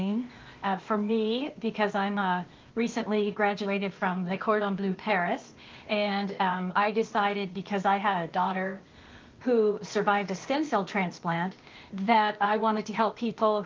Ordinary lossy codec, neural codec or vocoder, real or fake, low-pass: Opus, 32 kbps; codec, 16 kHz, 0.8 kbps, ZipCodec; fake; 7.2 kHz